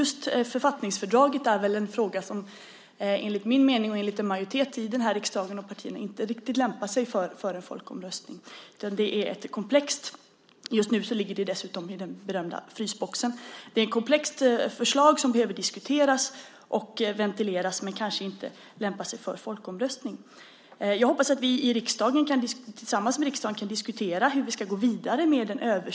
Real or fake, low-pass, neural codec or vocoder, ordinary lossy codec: real; none; none; none